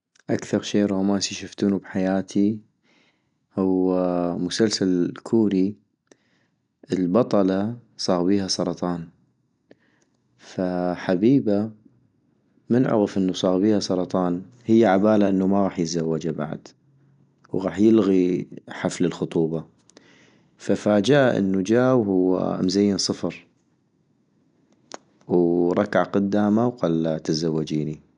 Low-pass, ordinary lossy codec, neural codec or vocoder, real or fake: 9.9 kHz; none; none; real